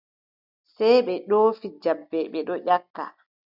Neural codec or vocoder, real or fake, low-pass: none; real; 5.4 kHz